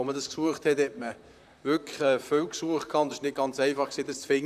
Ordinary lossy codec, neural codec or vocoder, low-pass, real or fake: none; vocoder, 44.1 kHz, 128 mel bands, Pupu-Vocoder; 14.4 kHz; fake